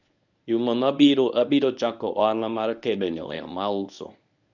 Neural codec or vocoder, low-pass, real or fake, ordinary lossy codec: codec, 24 kHz, 0.9 kbps, WavTokenizer, medium speech release version 1; 7.2 kHz; fake; none